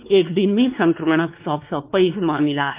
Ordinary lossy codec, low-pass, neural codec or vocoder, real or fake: Opus, 24 kbps; 3.6 kHz; codec, 16 kHz, 2 kbps, X-Codec, WavLM features, trained on Multilingual LibriSpeech; fake